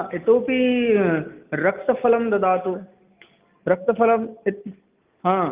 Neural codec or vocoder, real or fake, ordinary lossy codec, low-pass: none; real; Opus, 24 kbps; 3.6 kHz